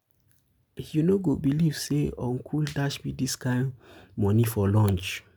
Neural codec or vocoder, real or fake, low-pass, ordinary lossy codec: none; real; none; none